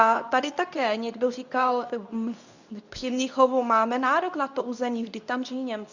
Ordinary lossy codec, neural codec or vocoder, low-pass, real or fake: Opus, 64 kbps; codec, 24 kHz, 0.9 kbps, WavTokenizer, medium speech release version 2; 7.2 kHz; fake